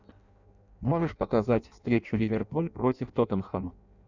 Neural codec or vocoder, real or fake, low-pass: codec, 16 kHz in and 24 kHz out, 0.6 kbps, FireRedTTS-2 codec; fake; 7.2 kHz